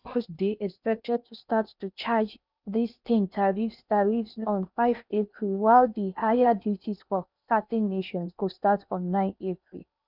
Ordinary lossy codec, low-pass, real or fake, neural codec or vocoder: none; 5.4 kHz; fake; codec, 16 kHz in and 24 kHz out, 0.8 kbps, FocalCodec, streaming, 65536 codes